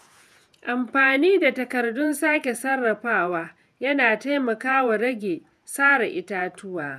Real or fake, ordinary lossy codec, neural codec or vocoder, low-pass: fake; none; vocoder, 48 kHz, 128 mel bands, Vocos; 14.4 kHz